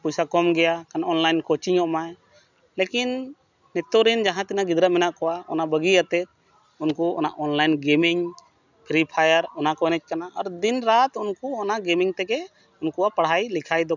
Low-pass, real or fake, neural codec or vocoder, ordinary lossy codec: 7.2 kHz; real; none; none